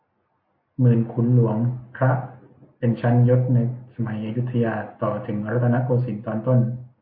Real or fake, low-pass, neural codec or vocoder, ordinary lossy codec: real; 5.4 kHz; none; MP3, 32 kbps